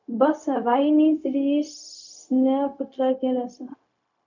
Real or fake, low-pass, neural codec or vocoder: fake; 7.2 kHz; codec, 16 kHz, 0.4 kbps, LongCat-Audio-Codec